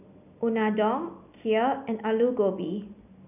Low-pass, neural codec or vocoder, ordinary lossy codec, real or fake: 3.6 kHz; none; none; real